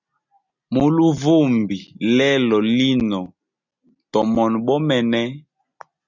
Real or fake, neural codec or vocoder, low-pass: real; none; 7.2 kHz